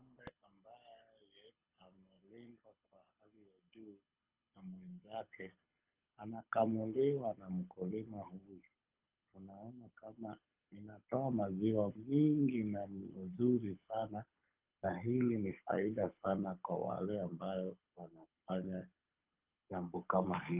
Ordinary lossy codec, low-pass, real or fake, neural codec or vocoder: Opus, 24 kbps; 3.6 kHz; fake; codec, 24 kHz, 6 kbps, HILCodec